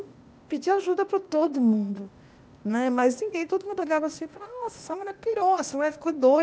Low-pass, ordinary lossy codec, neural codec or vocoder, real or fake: none; none; codec, 16 kHz, 0.8 kbps, ZipCodec; fake